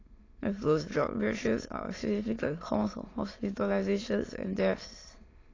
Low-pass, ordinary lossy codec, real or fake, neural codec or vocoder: 7.2 kHz; AAC, 32 kbps; fake; autoencoder, 22.05 kHz, a latent of 192 numbers a frame, VITS, trained on many speakers